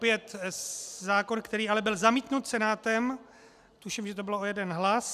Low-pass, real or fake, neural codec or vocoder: 14.4 kHz; real; none